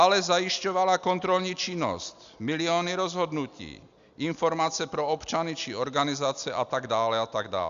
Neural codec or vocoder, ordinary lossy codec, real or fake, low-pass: none; Opus, 64 kbps; real; 7.2 kHz